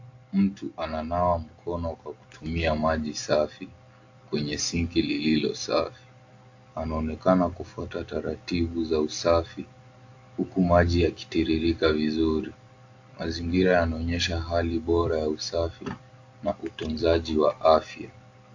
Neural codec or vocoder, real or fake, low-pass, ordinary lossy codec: none; real; 7.2 kHz; AAC, 48 kbps